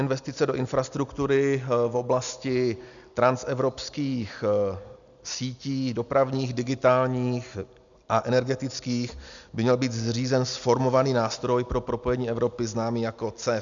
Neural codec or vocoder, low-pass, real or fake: none; 7.2 kHz; real